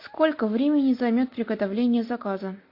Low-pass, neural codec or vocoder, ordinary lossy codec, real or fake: 5.4 kHz; none; MP3, 32 kbps; real